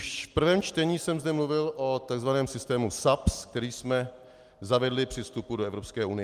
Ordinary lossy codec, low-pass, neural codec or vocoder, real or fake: Opus, 32 kbps; 14.4 kHz; none; real